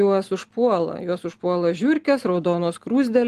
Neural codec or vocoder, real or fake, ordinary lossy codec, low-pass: none; real; Opus, 24 kbps; 14.4 kHz